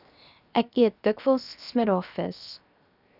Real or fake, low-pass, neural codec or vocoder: fake; 5.4 kHz; codec, 16 kHz, 0.7 kbps, FocalCodec